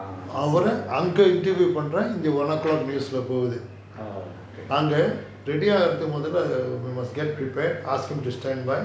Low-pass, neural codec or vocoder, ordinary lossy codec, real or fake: none; none; none; real